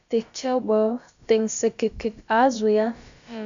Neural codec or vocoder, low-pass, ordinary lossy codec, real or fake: codec, 16 kHz, about 1 kbps, DyCAST, with the encoder's durations; 7.2 kHz; MP3, 64 kbps; fake